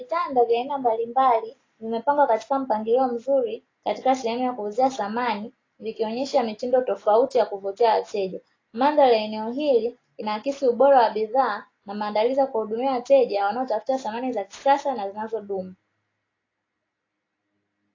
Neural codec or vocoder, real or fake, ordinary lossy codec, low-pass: none; real; AAC, 32 kbps; 7.2 kHz